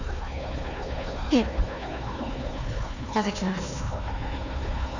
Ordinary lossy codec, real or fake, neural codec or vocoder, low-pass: none; fake; codec, 16 kHz, 1 kbps, FunCodec, trained on Chinese and English, 50 frames a second; 7.2 kHz